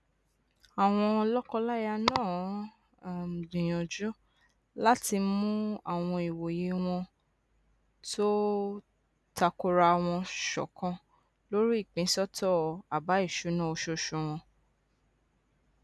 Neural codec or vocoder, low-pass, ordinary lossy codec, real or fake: none; none; none; real